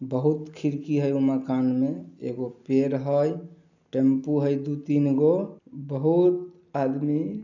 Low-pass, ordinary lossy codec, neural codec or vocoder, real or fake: 7.2 kHz; none; none; real